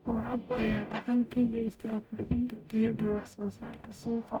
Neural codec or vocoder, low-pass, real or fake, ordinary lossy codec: codec, 44.1 kHz, 0.9 kbps, DAC; 19.8 kHz; fake; none